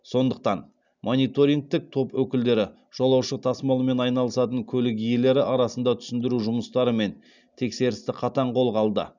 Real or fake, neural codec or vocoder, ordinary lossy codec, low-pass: real; none; none; 7.2 kHz